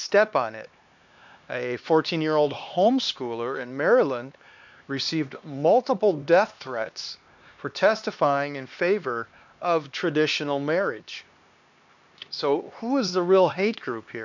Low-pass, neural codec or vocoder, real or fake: 7.2 kHz; codec, 16 kHz, 2 kbps, X-Codec, HuBERT features, trained on LibriSpeech; fake